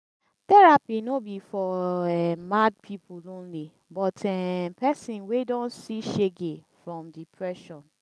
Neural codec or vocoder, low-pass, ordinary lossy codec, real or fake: none; none; none; real